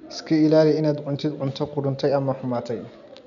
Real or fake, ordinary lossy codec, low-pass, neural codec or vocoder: real; none; 7.2 kHz; none